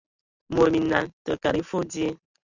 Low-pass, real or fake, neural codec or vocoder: 7.2 kHz; real; none